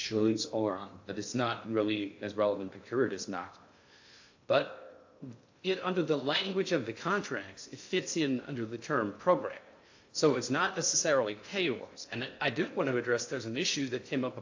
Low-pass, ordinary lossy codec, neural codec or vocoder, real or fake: 7.2 kHz; AAC, 48 kbps; codec, 16 kHz in and 24 kHz out, 0.6 kbps, FocalCodec, streaming, 2048 codes; fake